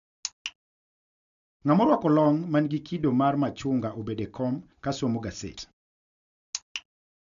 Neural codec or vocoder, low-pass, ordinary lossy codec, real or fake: none; 7.2 kHz; none; real